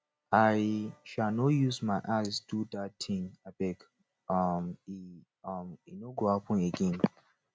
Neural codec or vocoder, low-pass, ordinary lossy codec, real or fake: none; none; none; real